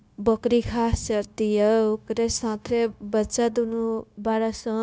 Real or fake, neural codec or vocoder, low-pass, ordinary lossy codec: fake; codec, 16 kHz, 0.9 kbps, LongCat-Audio-Codec; none; none